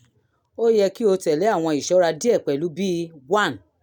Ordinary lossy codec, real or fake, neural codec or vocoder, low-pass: none; real; none; 19.8 kHz